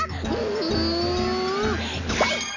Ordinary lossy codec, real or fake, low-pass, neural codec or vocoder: none; real; 7.2 kHz; none